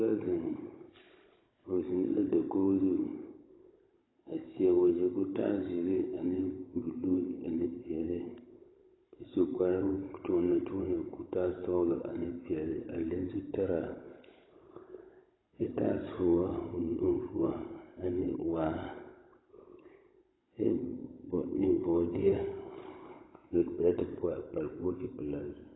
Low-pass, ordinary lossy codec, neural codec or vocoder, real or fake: 7.2 kHz; AAC, 16 kbps; codec, 16 kHz, 16 kbps, FunCodec, trained on Chinese and English, 50 frames a second; fake